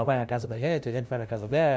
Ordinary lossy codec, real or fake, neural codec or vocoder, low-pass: none; fake; codec, 16 kHz, 0.5 kbps, FunCodec, trained on LibriTTS, 25 frames a second; none